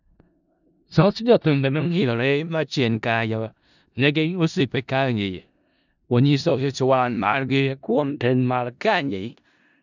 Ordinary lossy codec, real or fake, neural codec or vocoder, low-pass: none; fake; codec, 16 kHz in and 24 kHz out, 0.4 kbps, LongCat-Audio-Codec, four codebook decoder; 7.2 kHz